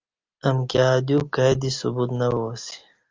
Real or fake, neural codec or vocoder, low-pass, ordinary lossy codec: real; none; 7.2 kHz; Opus, 32 kbps